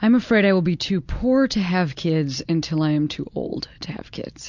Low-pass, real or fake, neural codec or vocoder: 7.2 kHz; real; none